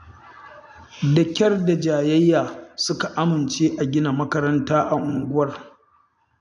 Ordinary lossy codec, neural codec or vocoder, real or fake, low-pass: none; none; real; 10.8 kHz